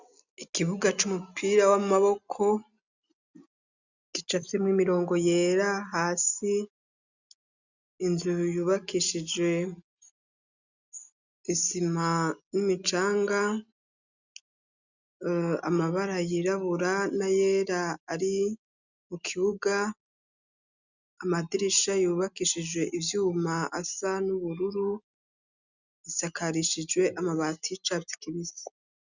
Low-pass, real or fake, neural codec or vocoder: 7.2 kHz; real; none